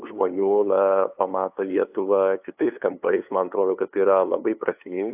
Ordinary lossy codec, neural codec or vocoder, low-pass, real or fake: AAC, 32 kbps; codec, 16 kHz, 2 kbps, FunCodec, trained on LibriTTS, 25 frames a second; 3.6 kHz; fake